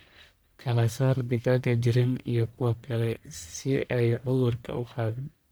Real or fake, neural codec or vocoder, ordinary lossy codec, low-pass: fake; codec, 44.1 kHz, 1.7 kbps, Pupu-Codec; none; none